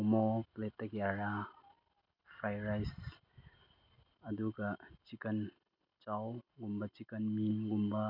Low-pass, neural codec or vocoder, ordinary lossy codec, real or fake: 5.4 kHz; none; none; real